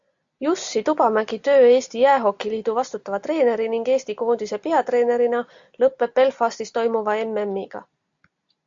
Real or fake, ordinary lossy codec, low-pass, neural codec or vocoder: real; AAC, 64 kbps; 7.2 kHz; none